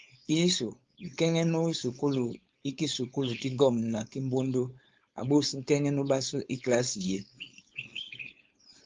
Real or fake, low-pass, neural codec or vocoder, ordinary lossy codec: fake; 7.2 kHz; codec, 16 kHz, 4.8 kbps, FACodec; Opus, 32 kbps